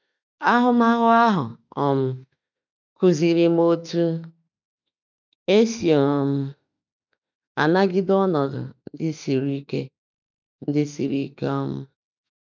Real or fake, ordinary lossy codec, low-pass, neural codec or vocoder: fake; none; 7.2 kHz; autoencoder, 48 kHz, 32 numbers a frame, DAC-VAE, trained on Japanese speech